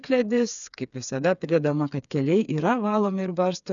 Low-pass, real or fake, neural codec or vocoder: 7.2 kHz; fake; codec, 16 kHz, 4 kbps, FreqCodec, smaller model